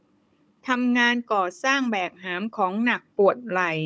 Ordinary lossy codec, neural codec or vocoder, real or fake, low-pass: none; codec, 16 kHz, 16 kbps, FunCodec, trained on Chinese and English, 50 frames a second; fake; none